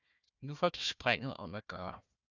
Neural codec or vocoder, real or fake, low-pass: codec, 16 kHz, 1 kbps, FunCodec, trained on Chinese and English, 50 frames a second; fake; 7.2 kHz